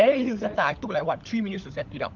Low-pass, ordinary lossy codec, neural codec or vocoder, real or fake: 7.2 kHz; Opus, 32 kbps; codec, 16 kHz, 16 kbps, FunCodec, trained on LibriTTS, 50 frames a second; fake